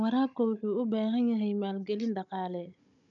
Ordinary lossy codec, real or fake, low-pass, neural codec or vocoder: none; fake; 7.2 kHz; codec, 16 kHz, 16 kbps, FunCodec, trained on Chinese and English, 50 frames a second